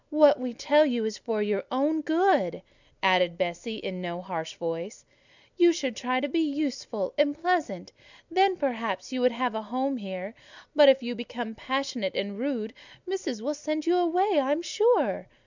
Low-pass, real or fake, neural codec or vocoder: 7.2 kHz; real; none